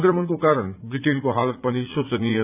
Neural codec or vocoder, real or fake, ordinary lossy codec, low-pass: vocoder, 44.1 kHz, 80 mel bands, Vocos; fake; none; 3.6 kHz